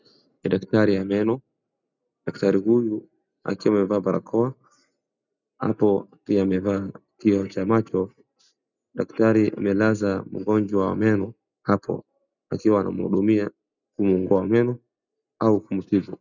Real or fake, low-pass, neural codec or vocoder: real; 7.2 kHz; none